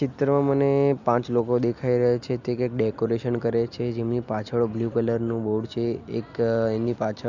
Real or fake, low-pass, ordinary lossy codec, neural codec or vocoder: real; 7.2 kHz; none; none